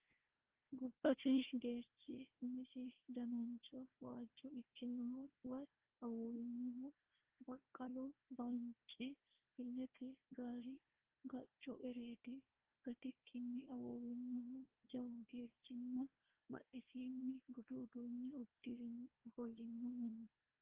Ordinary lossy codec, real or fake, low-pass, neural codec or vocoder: Opus, 16 kbps; fake; 3.6 kHz; codec, 16 kHz, 1 kbps, FunCodec, trained on Chinese and English, 50 frames a second